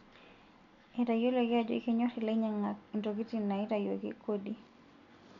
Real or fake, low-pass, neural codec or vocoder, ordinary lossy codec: real; 7.2 kHz; none; none